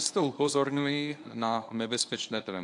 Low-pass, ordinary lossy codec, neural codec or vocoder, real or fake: 10.8 kHz; AAC, 64 kbps; codec, 24 kHz, 0.9 kbps, WavTokenizer, medium speech release version 1; fake